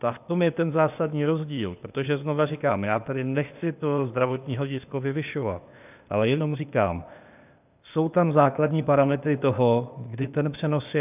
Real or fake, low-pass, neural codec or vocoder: fake; 3.6 kHz; codec, 16 kHz, 0.8 kbps, ZipCodec